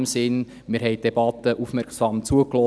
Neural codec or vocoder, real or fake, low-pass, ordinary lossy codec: none; real; none; none